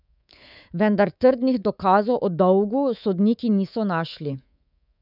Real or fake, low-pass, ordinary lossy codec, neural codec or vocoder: fake; 5.4 kHz; none; codec, 24 kHz, 3.1 kbps, DualCodec